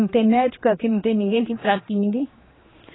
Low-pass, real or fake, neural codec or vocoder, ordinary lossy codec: 7.2 kHz; fake; codec, 16 kHz, 2 kbps, X-Codec, HuBERT features, trained on general audio; AAC, 16 kbps